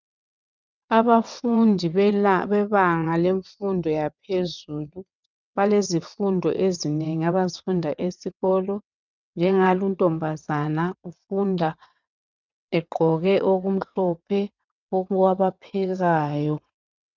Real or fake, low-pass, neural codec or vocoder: fake; 7.2 kHz; vocoder, 22.05 kHz, 80 mel bands, WaveNeXt